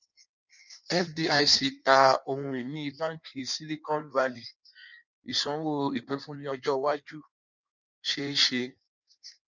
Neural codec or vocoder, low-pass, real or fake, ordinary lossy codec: codec, 16 kHz in and 24 kHz out, 1.1 kbps, FireRedTTS-2 codec; 7.2 kHz; fake; none